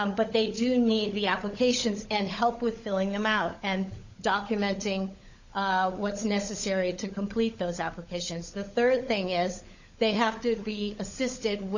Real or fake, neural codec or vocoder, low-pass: fake; codec, 16 kHz, 16 kbps, FunCodec, trained on LibriTTS, 50 frames a second; 7.2 kHz